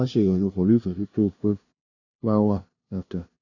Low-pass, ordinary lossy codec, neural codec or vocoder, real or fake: 7.2 kHz; none; codec, 16 kHz, 0.5 kbps, FunCodec, trained on LibriTTS, 25 frames a second; fake